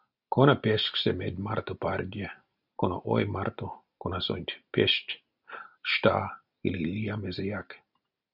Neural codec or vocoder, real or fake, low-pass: none; real; 5.4 kHz